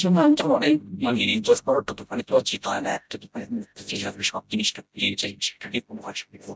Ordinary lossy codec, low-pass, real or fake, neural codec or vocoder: none; none; fake; codec, 16 kHz, 0.5 kbps, FreqCodec, smaller model